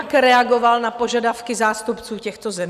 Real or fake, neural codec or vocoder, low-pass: real; none; 14.4 kHz